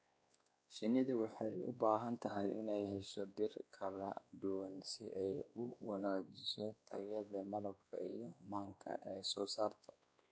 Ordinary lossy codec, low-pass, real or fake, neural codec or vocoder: none; none; fake; codec, 16 kHz, 2 kbps, X-Codec, WavLM features, trained on Multilingual LibriSpeech